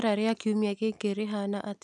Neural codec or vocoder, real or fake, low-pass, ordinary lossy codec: none; real; none; none